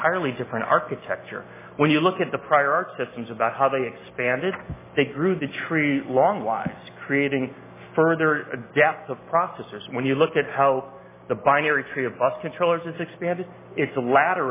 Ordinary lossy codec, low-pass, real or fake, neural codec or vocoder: MP3, 16 kbps; 3.6 kHz; real; none